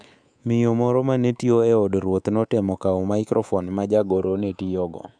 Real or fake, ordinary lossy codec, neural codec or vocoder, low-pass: fake; none; codec, 24 kHz, 3.1 kbps, DualCodec; 9.9 kHz